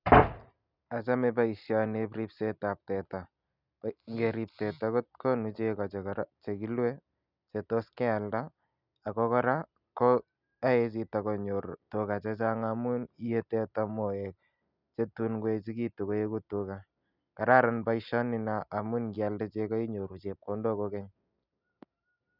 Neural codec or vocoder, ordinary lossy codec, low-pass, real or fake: none; none; 5.4 kHz; real